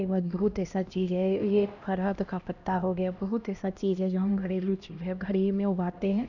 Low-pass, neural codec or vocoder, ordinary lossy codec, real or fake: 7.2 kHz; codec, 16 kHz, 1 kbps, X-Codec, HuBERT features, trained on LibriSpeech; none; fake